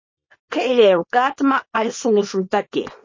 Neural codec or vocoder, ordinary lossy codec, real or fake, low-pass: codec, 24 kHz, 0.9 kbps, WavTokenizer, small release; MP3, 32 kbps; fake; 7.2 kHz